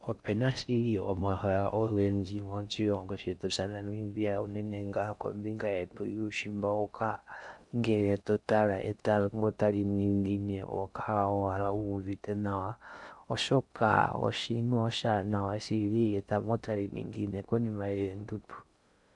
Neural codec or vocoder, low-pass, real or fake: codec, 16 kHz in and 24 kHz out, 0.6 kbps, FocalCodec, streaming, 4096 codes; 10.8 kHz; fake